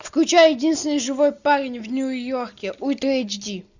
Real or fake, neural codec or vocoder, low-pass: real; none; 7.2 kHz